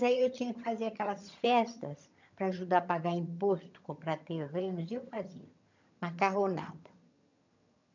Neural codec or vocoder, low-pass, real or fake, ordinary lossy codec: vocoder, 22.05 kHz, 80 mel bands, HiFi-GAN; 7.2 kHz; fake; none